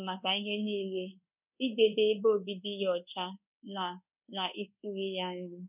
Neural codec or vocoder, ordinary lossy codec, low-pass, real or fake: codec, 24 kHz, 1.2 kbps, DualCodec; none; 3.6 kHz; fake